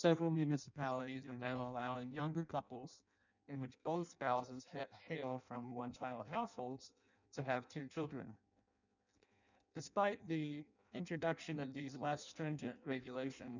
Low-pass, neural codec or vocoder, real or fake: 7.2 kHz; codec, 16 kHz in and 24 kHz out, 0.6 kbps, FireRedTTS-2 codec; fake